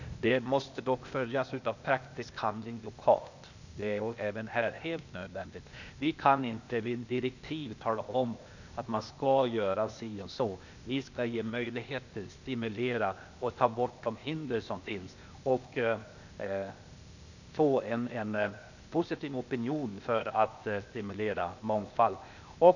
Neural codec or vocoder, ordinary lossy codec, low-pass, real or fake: codec, 16 kHz, 0.8 kbps, ZipCodec; none; 7.2 kHz; fake